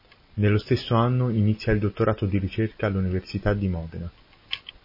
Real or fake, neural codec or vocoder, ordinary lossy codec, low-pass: real; none; MP3, 24 kbps; 5.4 kHz